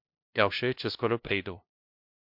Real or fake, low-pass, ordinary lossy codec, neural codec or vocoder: fake; 5.4 kHz; MP3, 48 kbps; codec, 16 kHz, 0.5 kbps, FunCodec, trained on LibriTTS, 25 frames a second